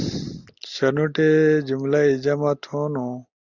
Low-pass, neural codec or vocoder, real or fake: 7.2 kHz; none; real